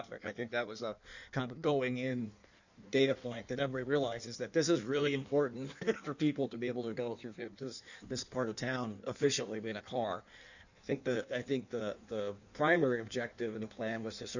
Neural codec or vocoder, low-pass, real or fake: codec, 16 kHz in and 24 kHz out, 1.1 kbps, FireRedTTS-2 codec; 7.2 kHz; fake